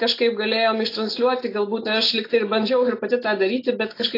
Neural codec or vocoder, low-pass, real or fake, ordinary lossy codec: none; 5.4 kHz; real; AAC, 32 kbps